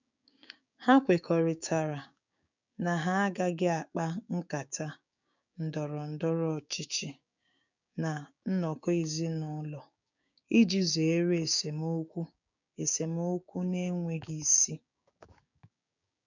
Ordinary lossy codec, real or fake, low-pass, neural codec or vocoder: none; fake; 7.2 kHz; codec, 16 kHz, 6 kbps, DAC